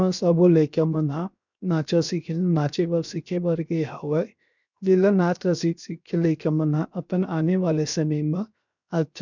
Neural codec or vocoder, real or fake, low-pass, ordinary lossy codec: codec, 16 kHz, 0.7 kbps, FocalCodec; fake; 7.2 kHz; none